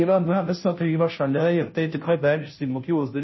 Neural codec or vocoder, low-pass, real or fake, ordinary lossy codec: codec, 16 kHz, 0.5 kbps, FunCodec, trained on Chinese and English, 25 frames a second; 7.2 kHz; fake; MP3, 24 kbps